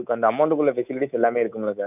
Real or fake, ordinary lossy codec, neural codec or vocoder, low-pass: fake; none; codec, 24 kHz, 3.1 kbps, DualCodec; 3.6 kHz